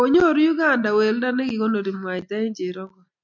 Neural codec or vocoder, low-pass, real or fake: none; 7.2 kHz; real